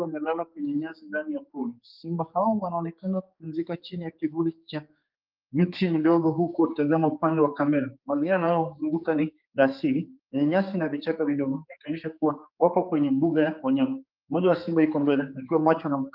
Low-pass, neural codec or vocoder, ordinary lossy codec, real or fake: 5.4 kHz; codec, 16 kHz, 4 kbps, X-Codec, HuBERT features, trained on general audio; Opus, 24 kbps; fake